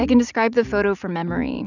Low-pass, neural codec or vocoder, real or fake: 7.2 kHz; none; real